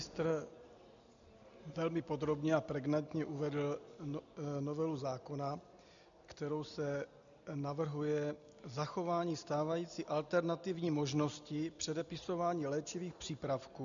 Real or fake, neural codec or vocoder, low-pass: real; none; 7.2 kHz